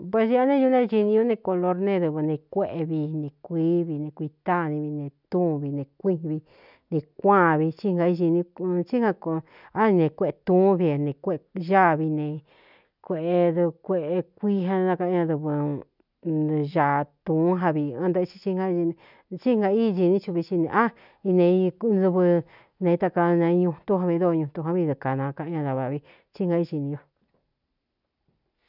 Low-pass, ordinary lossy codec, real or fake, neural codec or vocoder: 5.4 kHz; none; real; none